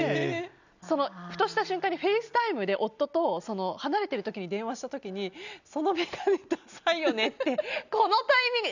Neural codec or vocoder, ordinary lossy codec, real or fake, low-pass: none; none; real; 7.2 kHz